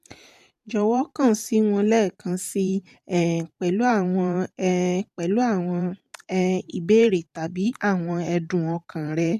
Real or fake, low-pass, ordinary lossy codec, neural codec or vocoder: fake; 14.4 kHz; MP3, 96 kbps; vocoder, 44.1 kHz, 128 mel bands every 512 samples, BigVGAN v2